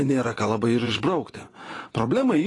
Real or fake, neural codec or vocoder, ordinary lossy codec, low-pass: fake; vocoder, 24 kHz, 100 mel bands, Vocos; AAC, 32 kbps; 10.8 kHz